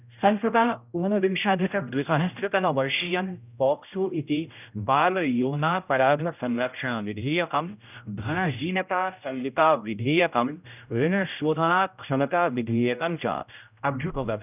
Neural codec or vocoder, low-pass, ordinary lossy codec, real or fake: codec, 16 kHz, 0.5 kbps, X-Codec, HuBERT features, trained on general audio; 3.6 kHz; none; fake